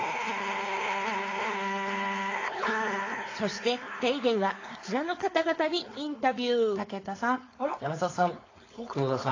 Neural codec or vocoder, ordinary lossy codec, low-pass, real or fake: codec, 16 kHz, 4.8 kbps, FACodec; AAC, 32 kbps; 7.2 kHz; fake